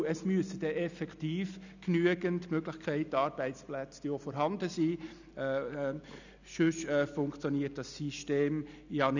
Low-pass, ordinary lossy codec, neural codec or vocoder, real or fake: 7.2 kHz; none; none; real